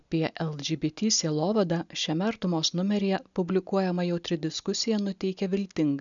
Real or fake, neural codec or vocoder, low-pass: real; none; 7.2 kHz